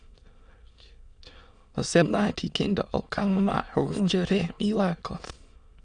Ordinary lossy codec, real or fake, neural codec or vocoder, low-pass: Opus, 64 kbps; fake; autoencoder, 22.05 kHz, a latent of 192 numbers a frame, VITS, trained on many speakers; 9.9 kHz